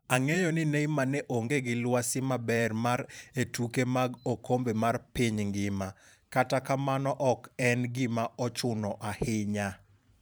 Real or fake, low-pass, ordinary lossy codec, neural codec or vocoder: fake; none; none; vocoder, 44.1 kHz, 128 mel bands every 512 samples, BigVGAN v2